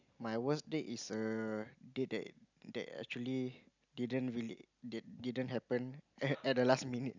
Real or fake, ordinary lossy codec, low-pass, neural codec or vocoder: real; none; 7.2 kHz; none